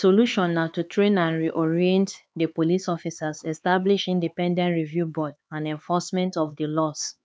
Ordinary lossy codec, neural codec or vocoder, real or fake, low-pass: none; codec, 16 kHz, 4 kbps, X-Codec, HuBERT features, trained on LibriSpeech; fake; none